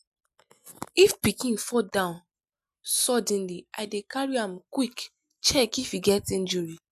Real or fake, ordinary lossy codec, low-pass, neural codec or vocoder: real; none; 14.4 kHz; none